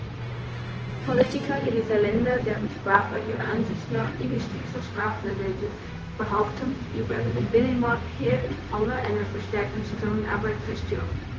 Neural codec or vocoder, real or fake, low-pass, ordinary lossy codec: codec, 16 kHz, 0.4 kbps, LongCat-Audio-Codec; fake; 7.2 kHz; Opus, 16 kbps